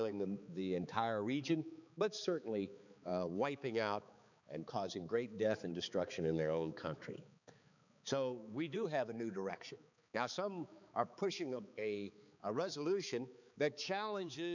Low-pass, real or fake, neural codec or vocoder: 7.2 kHz; fake; codec, 16 kHz, 4 kbps, X-Codec, HuBERT features, trained on balanced general audio